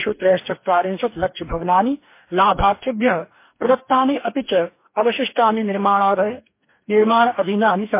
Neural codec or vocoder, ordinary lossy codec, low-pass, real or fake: codec, 44.1 kHz, 2.6 kbps, DAC; MP3, 24 kbps; 3.6 kHz; fake